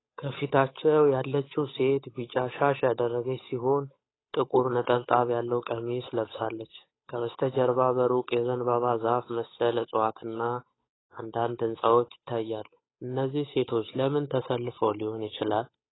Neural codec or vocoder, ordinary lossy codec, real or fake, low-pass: codec, 16 kHz, 8 kbps, FunCodec, trained on Chinese and English, 25 frames a second; AAC, 16 kbps; fake; 7.2 kHz